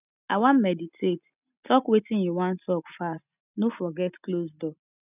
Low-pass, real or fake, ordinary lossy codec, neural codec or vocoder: 3.6 kHz; real; none; none